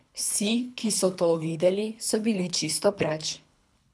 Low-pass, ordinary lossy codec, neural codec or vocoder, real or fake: none; none; codec, 24 kHz, 3 kbps, HILCodec; fake